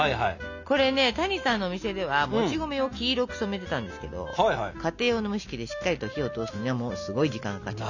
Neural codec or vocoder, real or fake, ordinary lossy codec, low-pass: none; real; none; 7.2 kHz